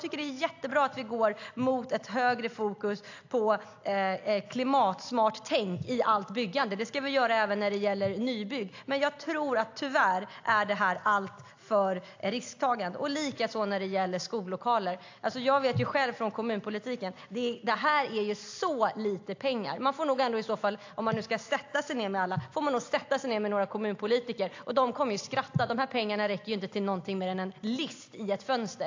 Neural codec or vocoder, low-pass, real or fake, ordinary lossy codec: none; 7.2 kHz; real; AAC, 48 kbps